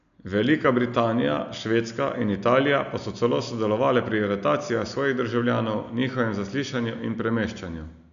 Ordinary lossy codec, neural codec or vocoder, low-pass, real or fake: MP3, 96 kbps; none; 7.2 kHz; real